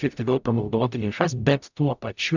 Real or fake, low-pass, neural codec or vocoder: fake; 7.2 kHz; codec, 44.1 kHz, 0.9 kbps, DAC